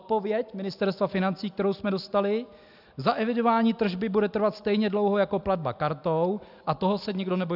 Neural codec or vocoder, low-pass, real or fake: none; 5.4 kHz; real